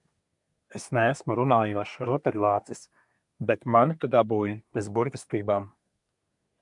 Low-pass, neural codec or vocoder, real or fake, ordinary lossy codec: 10.8 kHz; codec, 24 kHz, 1 kbps, SNAC; fake; MP3, 96 kbps